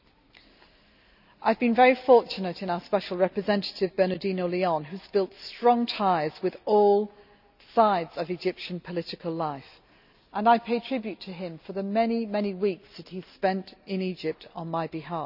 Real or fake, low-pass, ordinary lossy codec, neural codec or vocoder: real; 5.4 kHz; none; none